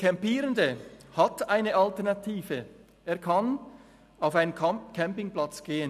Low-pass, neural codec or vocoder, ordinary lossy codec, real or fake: 14.4 kHz; none; none; real